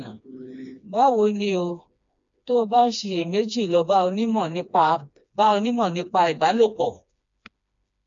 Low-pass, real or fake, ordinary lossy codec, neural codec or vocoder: 7.2 kHz; fake; MP3, 64 kbps; codec, 16 kHz, 2 kbps, FreqCodec, smaller model